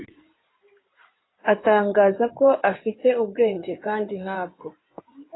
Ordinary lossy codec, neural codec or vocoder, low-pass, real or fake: AAC, 16 kbps; codec, 16 kHz in and 24 kHz out, 2.2 kbps, FireRedTTS-2 codec; 7.2 kHz; fake